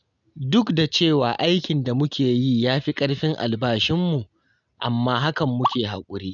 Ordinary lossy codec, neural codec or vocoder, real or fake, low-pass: none; none; real; 7.2 kHz